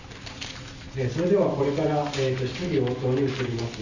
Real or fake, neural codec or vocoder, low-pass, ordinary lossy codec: real; none; 7.2 kHz; none